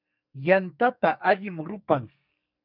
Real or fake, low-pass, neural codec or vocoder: fake; 5.4 kHz; codec, 44.1 kHz, 2.6 kbps, SNAC